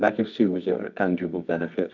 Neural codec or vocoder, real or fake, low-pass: codec, 24 kHz, 0.9 kbps, WavTokenizer, medium music audio release; fake; 7.2 kHz